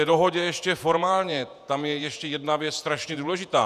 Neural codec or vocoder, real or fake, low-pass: vocoder, 48 kHz, 128 mel bands, Vocos; fake; 14.4 kHz